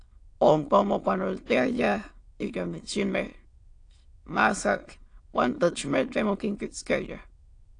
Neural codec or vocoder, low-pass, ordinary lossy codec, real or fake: autoencoder, 22.05 kHz, a latent of 192 numbers a frame, VITS, trained on many speakers; 9.9 kHz; AAC, 48 kbps; fake